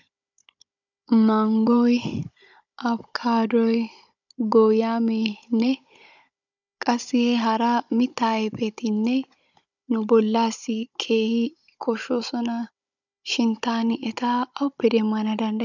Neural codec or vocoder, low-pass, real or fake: codec, 16 kHz, 16 kbps, FunCodec, trained on Chinese and English, 50 frames a second; 7.2 kHz; fake